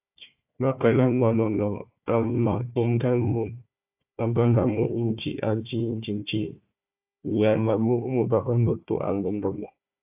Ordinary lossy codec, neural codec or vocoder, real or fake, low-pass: AAC, 32 kbps; codec, 16 kHz, 1 kbps, FunCodec, trained on Chinese and English, 50 frames a second; fake; 3.6 kHz